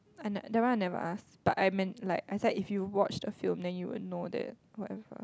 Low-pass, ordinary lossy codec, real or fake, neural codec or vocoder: none; none; real; none